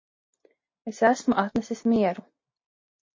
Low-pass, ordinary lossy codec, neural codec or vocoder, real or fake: 7.2 kHz; MP3, 32 kbps; none; real